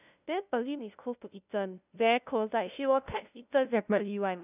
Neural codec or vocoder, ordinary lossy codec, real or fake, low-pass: codec, 16 kHz, 0.5 kbps, FunCodec, trained on LibriTTS, 25 frames a second; none; fake; 3.6 kHz